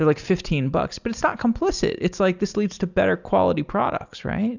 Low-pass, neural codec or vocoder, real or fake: 7.2 kHz; none; real